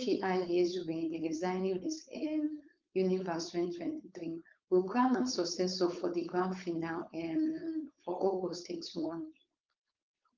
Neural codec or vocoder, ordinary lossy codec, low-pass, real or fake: codec, 16 kHz, 4.8 kbps, FACodec; Opus, 24 kbps; 7.2 kHz; fake